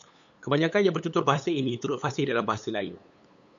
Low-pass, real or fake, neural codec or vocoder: 7.2 kHz; fake; codec, 16 kHz, 8 kbps, FunCodec, trained on LibriTTS, 25 frames a second